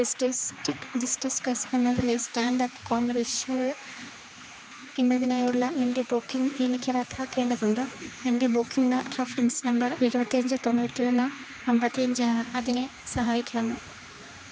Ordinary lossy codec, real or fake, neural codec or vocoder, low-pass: none; fake; codec, 16 kHz, 2 kbps, X-Codec, HuBERT features, trained on general audio; none